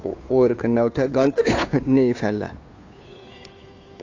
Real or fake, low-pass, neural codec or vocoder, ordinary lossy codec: fake; 7.2 kHz; codec, 16 kHz, 2 kbps, FunCodec, trained on Chinese and English, 25 frames a second; AAC, 48 kbps